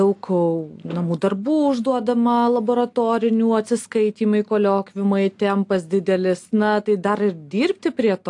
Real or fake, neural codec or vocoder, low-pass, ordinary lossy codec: real; none; 10.8 kHz; AAC, 64 kbps